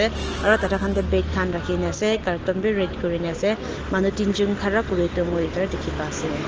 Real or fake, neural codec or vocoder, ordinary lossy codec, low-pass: real; none; Opus, 16 kbps; 7.2 kHz